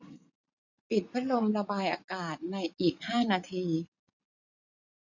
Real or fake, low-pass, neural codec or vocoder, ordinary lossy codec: fake; 7.2 kHz; vocoder, 44.1 kHz, 80 mel bands, Vocos; none